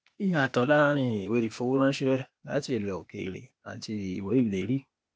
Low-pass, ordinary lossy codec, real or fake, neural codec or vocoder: none; none; fake; codec, 16 kHz, 0.8 kbps, ZipCodec